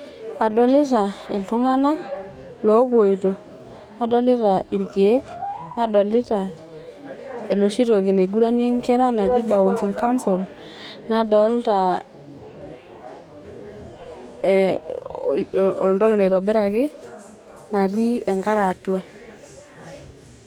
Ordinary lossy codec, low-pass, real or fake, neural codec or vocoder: none; 19.8 kHz; fake; codec, 44.1 kHz, 2.6 kbps, DAC